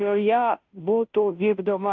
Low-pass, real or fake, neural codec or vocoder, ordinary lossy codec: 7.2 kHz; fake; codec, 16 kHz, 0.5 kbps, FunCodec, trained on Chinese and English, 25 frames a second; MP3, 64 kbps